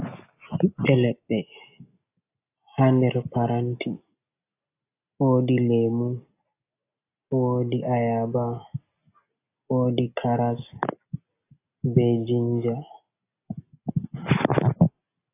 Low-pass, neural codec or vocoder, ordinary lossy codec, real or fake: 3.6 kHz; none; AAC, 24 kbps; real